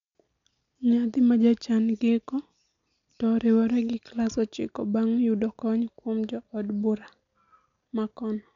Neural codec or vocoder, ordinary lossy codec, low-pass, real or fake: none; MP3, 96 kbps; 7.2 kHz; real